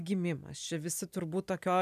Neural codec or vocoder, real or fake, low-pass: none; real; 14.4 kHz